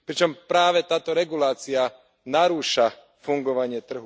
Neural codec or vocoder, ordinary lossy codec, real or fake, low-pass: none; none; real; none